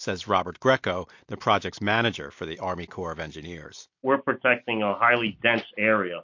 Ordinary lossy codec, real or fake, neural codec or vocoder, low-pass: MP3, 48 kbps; real; none; 7.2 kHz